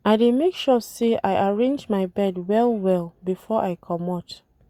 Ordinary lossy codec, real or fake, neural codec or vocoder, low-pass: Opus, 64 kbps; real; none; 19.8 kHz